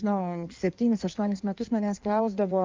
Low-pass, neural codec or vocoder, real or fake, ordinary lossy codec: 7.2 kHz; codec, 16 kHz in and 24 kHz out, 1.1 kbps, FireRedTTS-2 codec; fake; Opus, 24 kbps